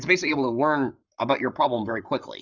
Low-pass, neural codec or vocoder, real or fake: 7.2 kHz; codec, 44.1 kHz, 7.8 kbps, Pupu-Codec; fake